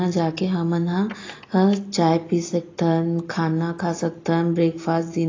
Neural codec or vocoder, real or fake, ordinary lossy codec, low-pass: none; real; AAC, 32 kbps; 7.2 kHz